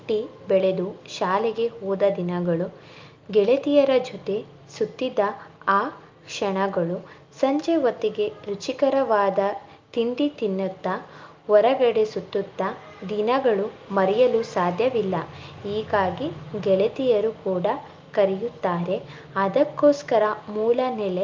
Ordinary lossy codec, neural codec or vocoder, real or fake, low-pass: Opus, 24 kbps; none; real; 7.2 kHz